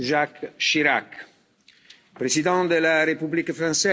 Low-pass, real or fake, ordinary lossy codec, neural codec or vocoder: none; real; none; none